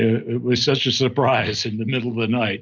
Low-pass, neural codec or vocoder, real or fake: 7.2 kHz; none; real